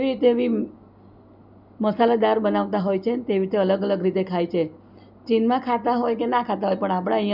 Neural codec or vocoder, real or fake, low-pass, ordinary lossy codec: none; real; 5.4 kHz; none